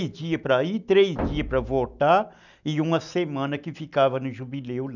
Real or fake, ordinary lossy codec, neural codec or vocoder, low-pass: real; none; none; 7.2 kHz